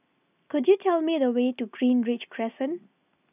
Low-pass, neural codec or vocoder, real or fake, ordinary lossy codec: 3.6 kHz; none; real; none